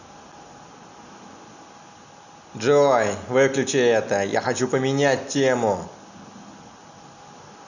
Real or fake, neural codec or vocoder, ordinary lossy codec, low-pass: real; none; none; 7.2 kHz